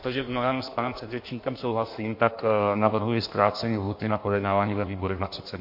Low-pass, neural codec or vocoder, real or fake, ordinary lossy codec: 5.4 kHz; codec, 16 kHz in and 24 kHz out, 1.1 kbps, FireRedTTS-2 codec; fake; MP3, 32 kbps